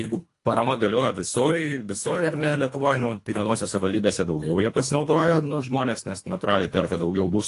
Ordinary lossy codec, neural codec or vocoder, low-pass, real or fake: AAC, 48 kbps; codec, 24 kHz, 1.5 kbps, HILCodec; 10.8 kHz; fake